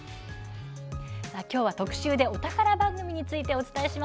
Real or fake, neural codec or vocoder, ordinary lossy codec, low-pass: real; none; none; none